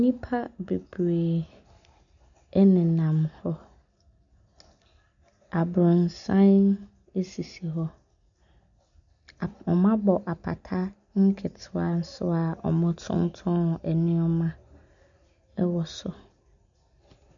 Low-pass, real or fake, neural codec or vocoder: 7.2 kHz; real; none